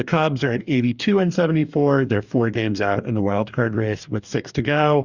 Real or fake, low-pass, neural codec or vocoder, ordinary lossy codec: fake; 7.2 kHz; codec, 44.1 kHz, 2.6 kbps, DAC; Opus, 64 kbps